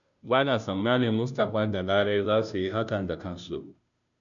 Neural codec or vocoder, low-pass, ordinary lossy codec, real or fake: codec, 16 kHz, 0.5 kbps, FunCodec, trained on Chinese and English, 25 frames a second; 7.2 kHz; MP3, 96 kbps; fake